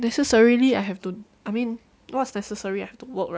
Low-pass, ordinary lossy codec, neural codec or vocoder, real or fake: none; none; none; real